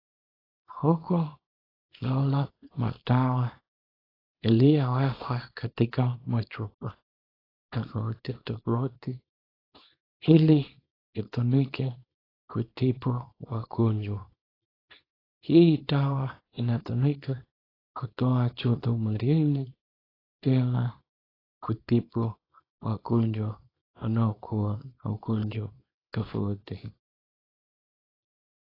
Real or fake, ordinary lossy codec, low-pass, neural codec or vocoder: fake; AAC, 24 kbps; 5.4 kHz; codec, 24 kHz, 0.9 kbps, WavTokenizer, small release